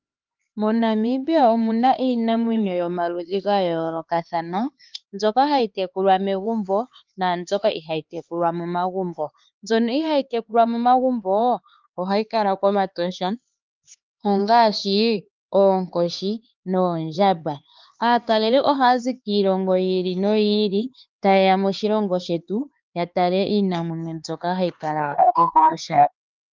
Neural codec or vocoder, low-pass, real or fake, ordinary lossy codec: codec, 16 kHz, 4 kbps, X-Codec, HuBERT features, trained on LibriSpeech; 7.2 kHz; fake; Opus, 24 kbps